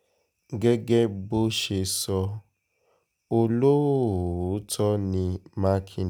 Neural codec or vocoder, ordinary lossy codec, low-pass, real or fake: none; none; none; real